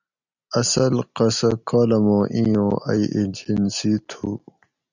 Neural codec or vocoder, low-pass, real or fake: none; 7.2 kHz; real